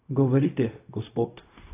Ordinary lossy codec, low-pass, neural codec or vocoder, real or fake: AAC, 24 kbps; 3.6 kHz; codec, 16 kHz, 0.4 kbps, LongCat-Audio-Codec; fake